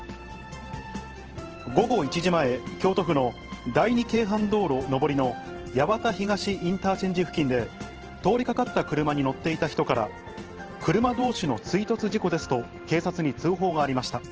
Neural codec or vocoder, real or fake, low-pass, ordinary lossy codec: vocoder, 44.1 kHz, 128 mel bands every 512 samples, BigVGAN v2; fake; 7.2 kHz; Opus, 16 kbps